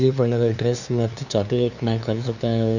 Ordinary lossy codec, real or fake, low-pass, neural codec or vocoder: none; fake; 7.2 kHz; codec, 16 kHz, 2 kbps, FunCodec, trained on LibriTTS, 25 frames a second